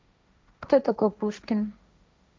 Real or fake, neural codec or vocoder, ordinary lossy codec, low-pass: fake; codec, 16 kHz, 1.1 kbps, Voila-Tokenizer; none; none